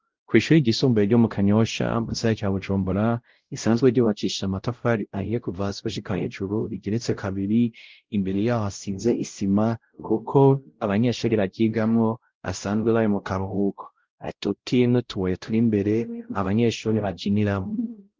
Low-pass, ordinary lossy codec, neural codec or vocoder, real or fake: 7.2 kHz; Opus, 16 kbps; codec, 16 kHz, 0.5 kbps, X-Codec, WavLM features, trained on Multilingual LibriSpeech; fake